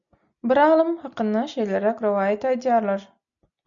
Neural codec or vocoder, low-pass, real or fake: none; 7.2 kHz; real